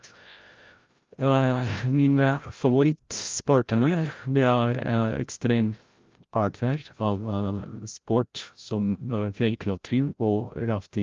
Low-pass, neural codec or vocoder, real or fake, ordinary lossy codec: 7.2 kHz; codec, 16 kHz, 0.5 kbps, FreqCodec, larger model; fake; Opus, 32 kbps